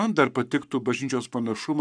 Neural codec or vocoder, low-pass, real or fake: codec, 44.1 kHz, 7.8 kbps, Pupu-Codec; 9.9 kHz; fake